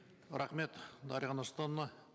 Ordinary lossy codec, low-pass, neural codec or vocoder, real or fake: none; none; none; real